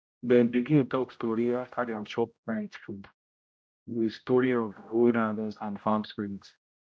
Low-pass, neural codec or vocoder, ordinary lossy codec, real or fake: none; codec, 16 kHz, 0.5 kbps, X-Codec, HuBERT features, trained on general audio; none; fake